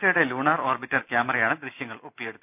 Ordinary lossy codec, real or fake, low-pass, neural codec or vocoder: none; real; 3.6 kHz; none